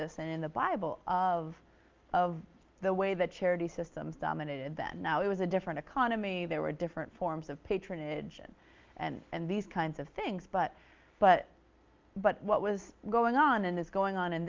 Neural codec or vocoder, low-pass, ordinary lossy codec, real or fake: none; 7.2 kHz; Opus, 32 kbps; real